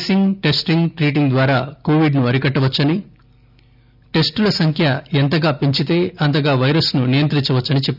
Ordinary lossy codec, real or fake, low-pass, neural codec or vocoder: none; real; 5.4 kHz; none